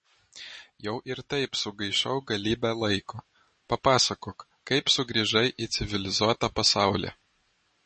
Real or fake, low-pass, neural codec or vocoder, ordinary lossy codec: real; 10.8 kHz; none; MP3, 32 kbps